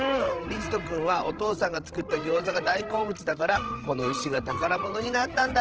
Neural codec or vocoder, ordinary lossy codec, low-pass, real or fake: codec, 16 kHz, 8 kbps, FreqCodec, larger model; Opus, 16 kbps; 7.2 kHz; fake